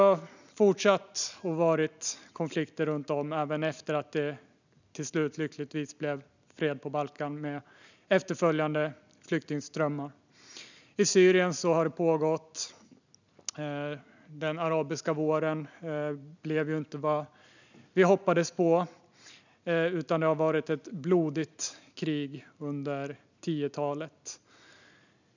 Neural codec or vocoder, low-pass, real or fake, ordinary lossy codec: none; 7.2 kHz; real; none